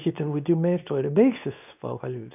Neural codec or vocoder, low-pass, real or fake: codec, 24 kHz, 0.9 kbps, WavTokenizer, medium speech release version 2; 3.6 kHz; fake